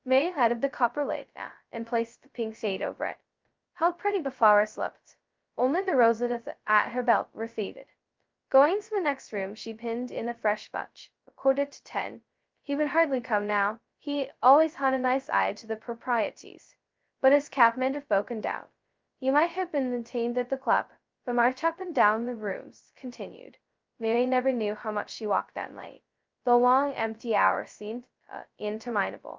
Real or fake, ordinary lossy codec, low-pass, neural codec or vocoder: fake; Opus, 32 kbps; 7.2 kHz; codec, 16 kHz, 0.2 kbps, FocalCodec